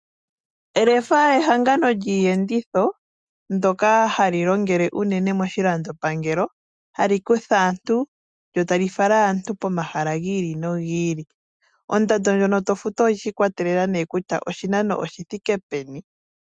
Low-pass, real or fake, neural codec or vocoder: 9.9 kHz; real; none